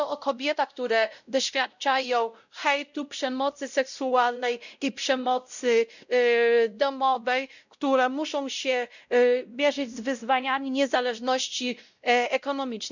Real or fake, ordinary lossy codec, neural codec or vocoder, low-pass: fake; none; codec, 16 kHz, 0.5 kbps, X-Codec, WavLM features, trained on Multilingual LibriSpeech; 7.2 kHz